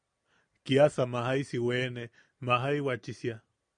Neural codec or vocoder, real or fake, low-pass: none; real; 9.9 kHz